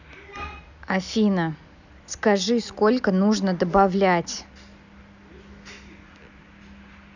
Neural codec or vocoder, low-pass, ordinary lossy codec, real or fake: none; 7.2 kHz; none; real